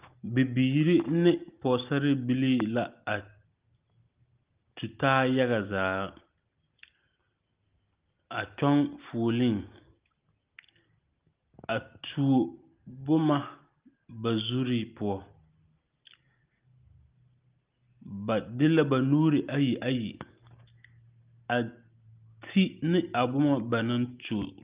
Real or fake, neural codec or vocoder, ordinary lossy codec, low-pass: real; none; Opus, 32 kbps; 3.6 kHz